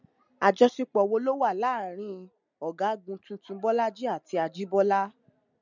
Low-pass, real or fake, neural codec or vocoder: 7.2 kHz; real; none